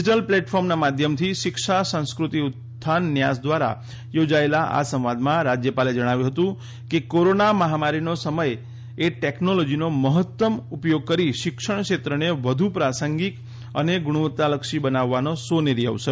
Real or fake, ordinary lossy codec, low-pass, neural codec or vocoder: real; none; none; none